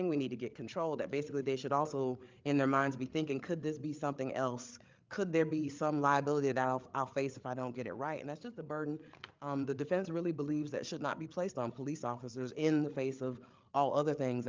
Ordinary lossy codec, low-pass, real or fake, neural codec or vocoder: Opus, 24 kbps; 7.2 kHz; fake; codec, 16 kHz, 8 kbps, FreqCodec, larger model